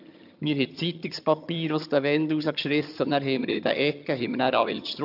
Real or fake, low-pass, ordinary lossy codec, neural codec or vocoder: fake; 5.4 kHz; AAC, 48 kbps; vocoder, 22.05 kHz, 80 mel bands, HiFi-GAN